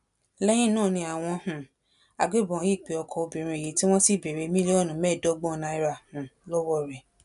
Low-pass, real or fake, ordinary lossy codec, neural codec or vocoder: 10.8 kHz; real; none; none